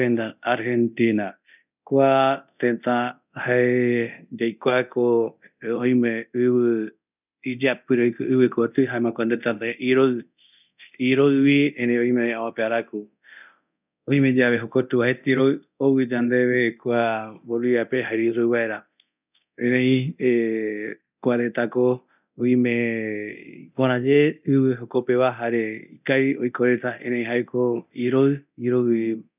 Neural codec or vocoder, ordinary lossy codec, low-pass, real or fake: codec, 24 kHz, 0.9 kbps, DualCodec; none; 3.6 kHz; fake